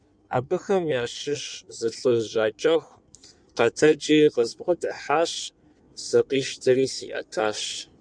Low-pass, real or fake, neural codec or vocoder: 9.9 kHz; fake; codec, 16 kHz in and 24 kHz out, 1.1 kbps, FireRedTTS-2 codec